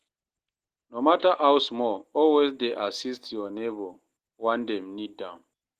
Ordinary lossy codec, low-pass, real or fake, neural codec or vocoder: Opus, 16 kbps; 14.4 kHz; real; none